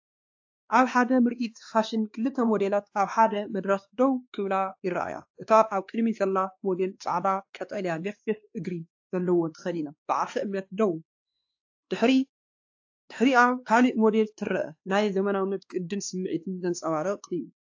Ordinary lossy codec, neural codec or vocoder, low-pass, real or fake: AAC, 48 kbps; codec, 16 kHz, 2 kbps, X-Codec, WavLM features, trained on Multilingual LibriSpeech; 7.2 kHz; fake